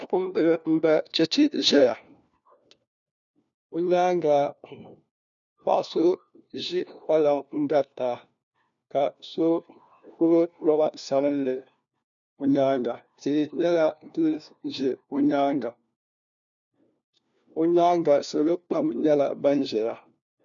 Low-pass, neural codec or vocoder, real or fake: 7.2 kHz; codec, 16 kHz, 1 kbps, FunCodec, trained on LibriTTS, 50 frames a second; fake